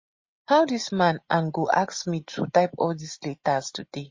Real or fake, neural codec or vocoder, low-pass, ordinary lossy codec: real; none; 7.2 kHz; MP3, 32 kbps